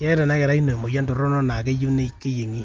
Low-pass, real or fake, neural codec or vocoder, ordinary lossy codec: 7.2 kHz; real; none; Opus, 32 kbps